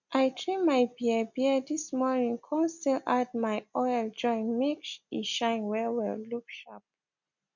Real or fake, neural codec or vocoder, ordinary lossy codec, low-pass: real; none; none; 7.2 kHz